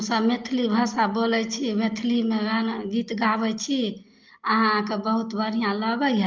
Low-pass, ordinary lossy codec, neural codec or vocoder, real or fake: 7.2 kHz; Opus, 32 kbps; none; real